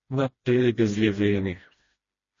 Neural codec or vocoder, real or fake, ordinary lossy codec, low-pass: codec, 16 kHz, 1 kbps, FreqCodec, smaller model; fake; MP3, 32 kbps; 7.2 kHz